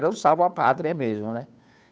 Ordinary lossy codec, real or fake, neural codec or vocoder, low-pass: none; fake; codec, 16 kHz, 2 kbps, FunCodec, trained on Chinese and English, 25 frames a second; none